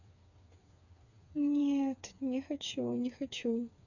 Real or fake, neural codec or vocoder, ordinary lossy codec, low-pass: fake; codec, 16 kHz, 4 kbps, FreqCodec, smaller model; none; 7.2 kHz